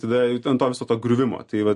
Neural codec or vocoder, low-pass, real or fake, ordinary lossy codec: none; 10.8 kHz; real; MP3, 48 kbps